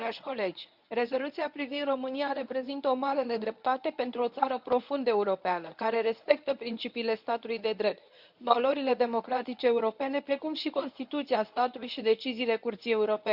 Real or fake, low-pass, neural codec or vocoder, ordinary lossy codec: fake; 5.4 kHz; codec, 24 kHz, 0.9 kbps, WavTokenizer, medium speech release version 1; none